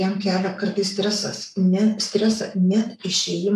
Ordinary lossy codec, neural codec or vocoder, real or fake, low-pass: MP3, 96 kbps; codec, 44.1 kHz, 7.8 kbps, Pupu-Codec; fake; 14.4 kHz